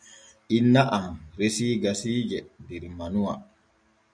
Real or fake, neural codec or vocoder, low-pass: real; none; 9.9 kHz